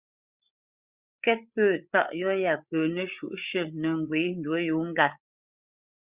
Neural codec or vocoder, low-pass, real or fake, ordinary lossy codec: codec, 16 kHz, 8 kbps, FreqCodec, larger model; 3.6 kHz; fake; Opus, 64 kbps